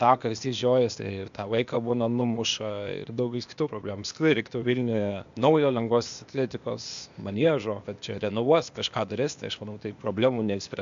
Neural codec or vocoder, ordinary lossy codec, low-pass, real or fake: codec, 16 kHz, 0.8 kbps, ZipCodec; MP3, 64 kbps; 7.2 kHz; fake